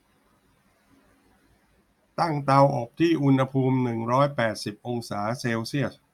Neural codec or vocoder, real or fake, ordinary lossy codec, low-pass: none; real; none; 19.8 kHz